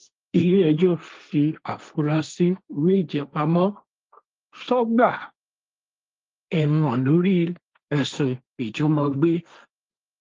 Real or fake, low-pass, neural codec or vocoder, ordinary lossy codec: fake; 7.2 kHz; codec, 16 kHz, 1.1 kbps, Voila-Tokenizer; Opus, 32 kbps